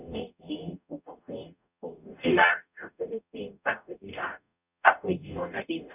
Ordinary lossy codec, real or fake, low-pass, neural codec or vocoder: none; fake; 3.6 kHz; codec, 44.1 kHz, 0.9 kbps, DAC